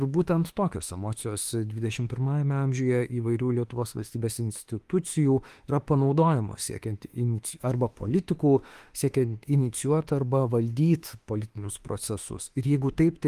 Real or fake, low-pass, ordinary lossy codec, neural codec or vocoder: fake; 14.4 kHz; Opus, 24 kbps; autoencoder, 48 kHz, 32 numbers a frame, DAC-VAE, trained on Japanese speech